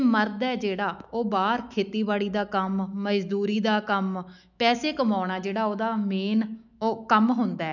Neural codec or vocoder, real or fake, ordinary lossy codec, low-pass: autoencoder, 48 kHz, 128 numbers a frame, DAC-VAE, trained on Japanese speech; fake; none; 7.2 kHz